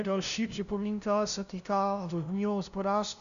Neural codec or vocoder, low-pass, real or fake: codec, 16 kHz, 0.5 kbps, FunCodec, trained on LibriTTS, 25 frames a second; 7.2 kHz; fake